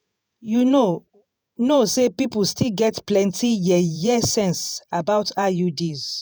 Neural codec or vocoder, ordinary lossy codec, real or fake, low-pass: vocoder, 48 kHz, 128 mel bands, Vocos; none; fake; none